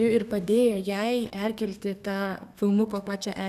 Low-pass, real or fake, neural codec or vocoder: 14.4 kHz; fake; codec, 32 kHz, 1.9 kbps, SNAC